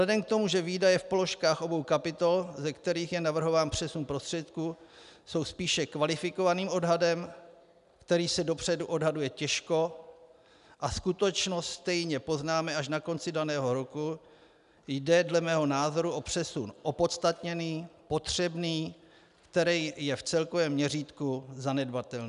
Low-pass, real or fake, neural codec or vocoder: 10.8 kHz; real; none